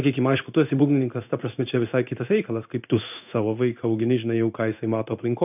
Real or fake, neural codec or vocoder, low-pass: fake; codec, 16 kHz in and 24 kHz out, 1 kbps, XY-Tokenizer; 3.6 kHz